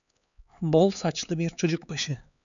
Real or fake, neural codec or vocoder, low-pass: fake; codec, 16 kHz, 4 kbps, X-Codec, HuBERT features, trained on LibriSpeech; 7.2 kHz